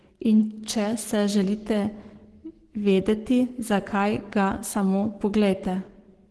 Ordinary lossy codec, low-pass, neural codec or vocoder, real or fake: Opus, 16 kbps; 10.8 kHz; codec, 44.1 kHz, 7.8 kbps, Pupu-Codec; fake